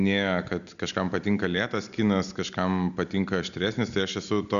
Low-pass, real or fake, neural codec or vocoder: 7.2 kHz; real; none